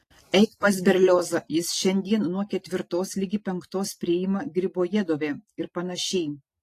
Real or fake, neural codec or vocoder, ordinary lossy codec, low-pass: real; none; AAC, 48 kbps; 14.4 kHz